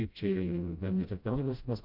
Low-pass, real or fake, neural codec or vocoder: 5.4 kHz; fake; codec, 16 kHz, 0.5 kbps, FreqCodec, smaller model